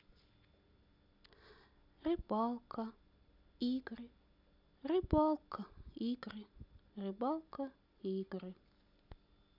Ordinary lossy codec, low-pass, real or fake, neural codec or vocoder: none; 5.4 kHz; real; none